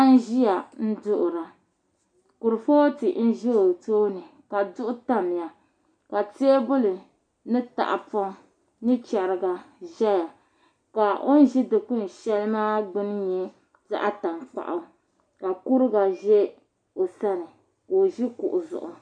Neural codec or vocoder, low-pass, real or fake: none; 9.9 kHz; real